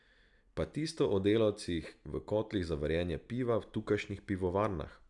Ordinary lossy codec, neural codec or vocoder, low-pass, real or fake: none; none; 10.8 kHz; real